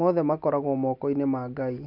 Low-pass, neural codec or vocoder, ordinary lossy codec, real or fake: 5.4 kHz; none; none; real